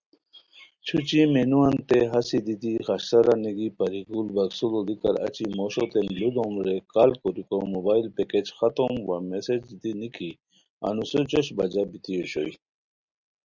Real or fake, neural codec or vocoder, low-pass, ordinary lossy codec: real; none; 7.2 kHz; Opus, 64 kbps